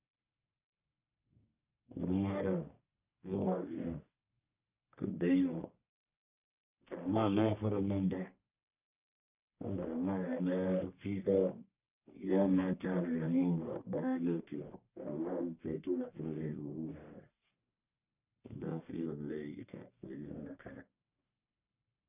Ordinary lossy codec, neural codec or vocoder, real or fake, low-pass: none; codec, 44.1 kHz, 1.7 kbps, Pupu-Codec; fake; 3.6 kHz